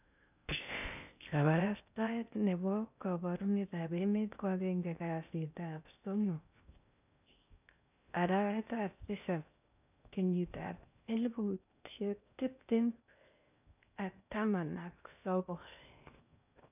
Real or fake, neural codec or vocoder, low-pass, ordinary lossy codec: fake; codec, 16 kHz in and 24 kHz out, 0.6 kbps, FocalCodec, streaming, 2048 codes; 3.6 kHz; none